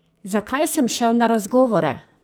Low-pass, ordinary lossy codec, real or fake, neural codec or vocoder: none; none; fake; codec, 44.1 kHz, 2.6 kbps, SNAC